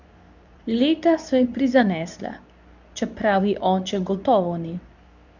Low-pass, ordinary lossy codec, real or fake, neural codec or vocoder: 7.2 kHz; none; fake; codec, 24 kHz, 0.9 kbps, WavTokenizer, medium speech release version 2